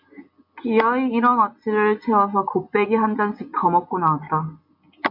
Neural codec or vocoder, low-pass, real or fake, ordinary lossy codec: none; 5.4 kHz; real; MP3, 48 kbps